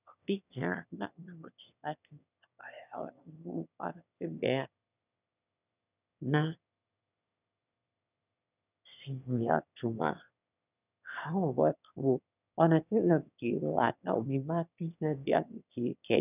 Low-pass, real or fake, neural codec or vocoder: 3.6 kHz; fake; autoencoder, 22.05 kHz, a latent of 192 numbers a frame, VITS, trained on one speaker